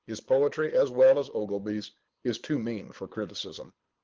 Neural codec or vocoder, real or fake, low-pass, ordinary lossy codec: codec, 24 kHz, 6 kbps, HILCodec; fake; 7.2 kHz; Opus, 16 kbps